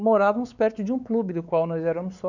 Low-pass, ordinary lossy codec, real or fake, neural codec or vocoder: 7.2 kHz; none; fake; codec, 16 kHz, 4 kbps, X-Codec, WavLM features, trained on Multilingual LibriSpeech